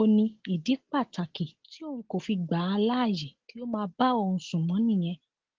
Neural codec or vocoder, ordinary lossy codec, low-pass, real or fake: none; Opus, 16 kbps; 7.2 kHz; real